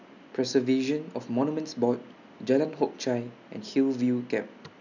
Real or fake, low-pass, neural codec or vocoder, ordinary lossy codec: real; 7.2 kHz; none; none